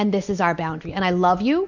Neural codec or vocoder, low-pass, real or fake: none; 7.2 kHz; real